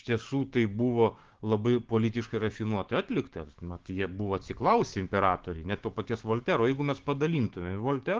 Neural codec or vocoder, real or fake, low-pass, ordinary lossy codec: codec, 16 kHz, 6 kbps, DAC; fake; 7.2 kHz; Opus, 16 kbps